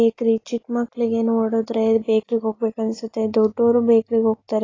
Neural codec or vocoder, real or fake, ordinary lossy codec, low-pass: none; real; AAC, 32 kbps; 7.2 kHz